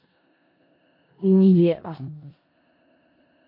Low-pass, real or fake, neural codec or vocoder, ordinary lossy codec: 5.4 kHz; fake; codec, 16 kHz in and 24 kHz out, 0.4 kbps, LongCat-Audio-Codec, four codebook decoder; MP3, 32 kbps